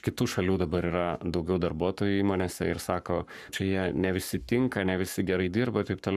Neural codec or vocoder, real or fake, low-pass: codec, 44.1 kHz, 7.8 kbps, Pupu-Codec; fake; 14.4 kHz